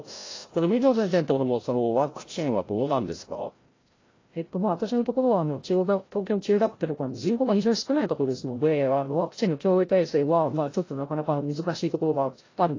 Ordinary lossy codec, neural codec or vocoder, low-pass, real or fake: AAC, 32 kbps; codec, 16 kHz, 0.5 kbps, FreqCodec, larger model; 7.2 kHz; fake